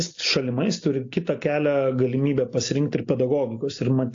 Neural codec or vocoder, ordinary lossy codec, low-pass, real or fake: none; AAC, 48 kbps; 7.2 kHz; real